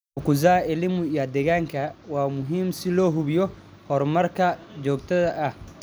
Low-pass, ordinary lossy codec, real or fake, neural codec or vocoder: none; none; real; none